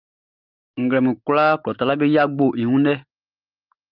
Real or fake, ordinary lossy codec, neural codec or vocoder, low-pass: real; Opus, 24 kbps; none; 5.4 kHz